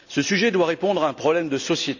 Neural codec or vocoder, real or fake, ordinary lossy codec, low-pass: none; real; none; 7.2 kHz